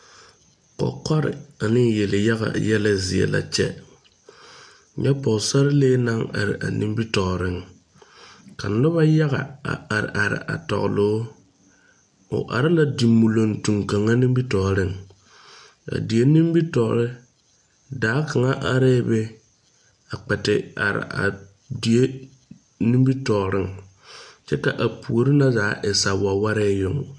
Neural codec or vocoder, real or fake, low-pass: none; real; 9.9 kHz